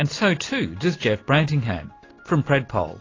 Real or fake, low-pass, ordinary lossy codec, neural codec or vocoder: real; 7.2 kHz; AAC, 32 kbps; none